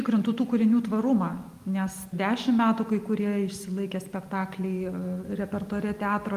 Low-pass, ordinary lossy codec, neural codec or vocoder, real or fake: 14.4 kHz; Opus, 32 kbps; none; real